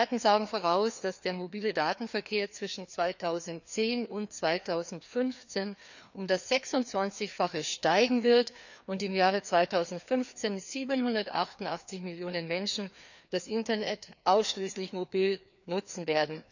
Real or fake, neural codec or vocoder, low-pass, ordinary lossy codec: fake; codec, 16 kHz, 2 kbps, FreqCodec, larger model; 7.2 kHz; none